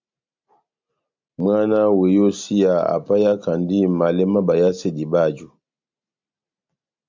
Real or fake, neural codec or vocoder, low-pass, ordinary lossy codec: real; none; 7.2 kHz; MP3, 64 kbps